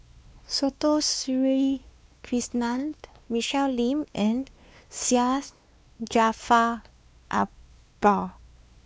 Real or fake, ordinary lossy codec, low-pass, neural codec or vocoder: fake; none; none; codec, 16 kHz, 2 kbps, X-Codec, WavLM features, trained on Multilingual LibriSpeech